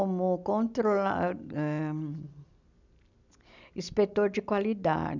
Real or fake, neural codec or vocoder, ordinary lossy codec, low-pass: real; none; none; 7.2 kHz